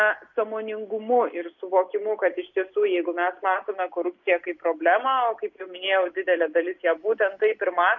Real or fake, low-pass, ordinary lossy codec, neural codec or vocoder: real; 7.2 kHz; MP3, 48 kbps; none